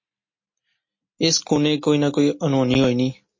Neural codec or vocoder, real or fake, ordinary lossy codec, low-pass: none; real; MP3, 32 kbps; 7.2 kHz